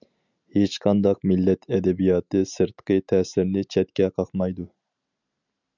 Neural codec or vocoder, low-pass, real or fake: none; 7.2 kHz; real